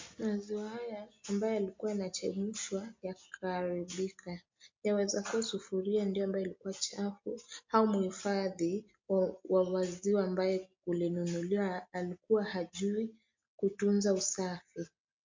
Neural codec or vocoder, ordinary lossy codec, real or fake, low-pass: none; MP3, 48 kbps; real; 7.2 kHz